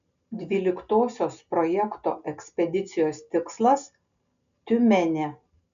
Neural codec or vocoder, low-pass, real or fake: none; 7.2 kHz; real